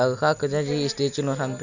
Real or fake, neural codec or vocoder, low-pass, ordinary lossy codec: real; none; 7.2 kHz; none